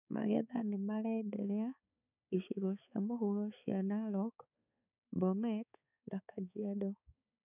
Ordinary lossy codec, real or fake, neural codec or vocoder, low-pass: none; fake; codec, 16 kHz, 4 kbps, X-Codec, HuBERT features, trained on balanced general audio; 3.6 kHz